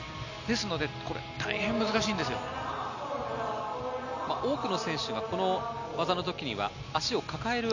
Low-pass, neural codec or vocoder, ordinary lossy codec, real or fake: 7.2 kHz; none; none; real